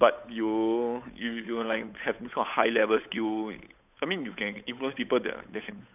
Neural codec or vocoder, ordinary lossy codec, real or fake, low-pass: codec, 16 kHz, 8 kbps, FunCodec, trained on Chinese and English, 25 frames a second; none; fake; 3.6 kHz